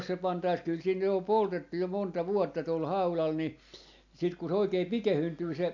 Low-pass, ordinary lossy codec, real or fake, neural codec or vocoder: 7.2 kHz; AAC, 48 kbps; real; none